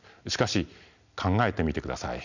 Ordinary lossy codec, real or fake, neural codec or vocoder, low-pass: none; real; none; 7.2 kHz